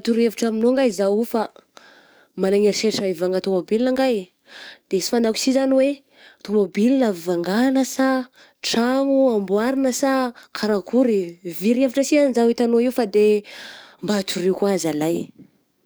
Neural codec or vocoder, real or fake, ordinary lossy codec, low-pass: codec, 44.1 kHz, 7.8 kbps, DAC; fake; none; none